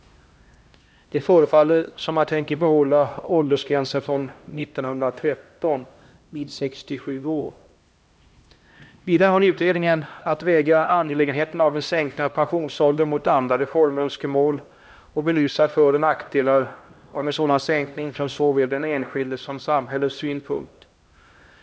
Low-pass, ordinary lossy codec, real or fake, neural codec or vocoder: none; none; fake; codec, 16 kHz, 1 kbps, X-Codec, HuBERT features, trained on LibriSpeech